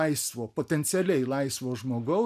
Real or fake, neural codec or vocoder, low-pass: real; none; 14.4 kHz